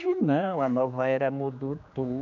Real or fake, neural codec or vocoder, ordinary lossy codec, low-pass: fake; codec, 16 kHz, 2 kbps, X-Codec, HuBERT features, trained on general audio; none; 7.2 kHz